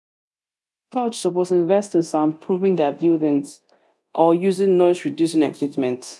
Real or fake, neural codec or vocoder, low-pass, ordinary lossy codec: fake; codec, 24 kHz, 0.9 kbps, DualCodec; none; none